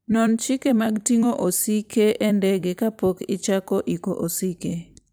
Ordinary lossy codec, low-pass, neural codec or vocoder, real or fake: none; none; vocoder, 44.1 kHz, 128 mel bands every 256 samples, BigVGAN v2; fake